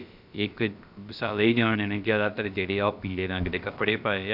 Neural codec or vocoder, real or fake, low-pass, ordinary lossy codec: codec, 16 kHz, about 1 kbps, DyCAST, with the encoder's durations; fake; 5.4 kHz; none